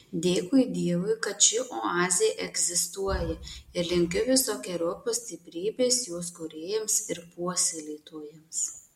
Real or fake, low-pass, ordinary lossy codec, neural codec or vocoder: real; 19.8 kHz; MP3, 64 kbps; none